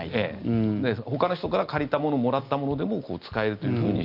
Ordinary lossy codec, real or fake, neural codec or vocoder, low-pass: Opus, 24 kbps; real; none; 5.4 kHz